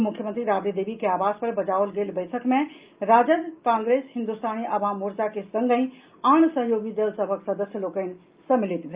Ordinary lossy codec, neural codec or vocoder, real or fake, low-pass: Opus, 32 kbps; none; real; 3.6 kHz